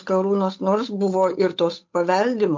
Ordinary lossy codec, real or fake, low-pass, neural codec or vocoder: MP3, 48 kbps; fake; 7.2 kHz; codec, 16 kHz, 8 kbps, FunCodec, trained on Chinese and English, 25 frames a second